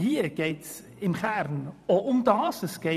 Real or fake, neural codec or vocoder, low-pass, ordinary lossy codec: fake; vocoder, 44.1 kHz, 128 mel bands every 512 samples, BigVGAN v2; 14.4 kHz; none